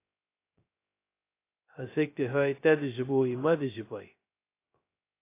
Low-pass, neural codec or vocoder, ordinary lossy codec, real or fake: 3.6 kHz; codec, 16 kHz, 0.2 kbps, FocalCodec; AAC, 24 kbps; fake